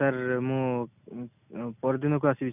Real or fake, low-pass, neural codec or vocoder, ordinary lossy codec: real; 3.6 kHz; none; none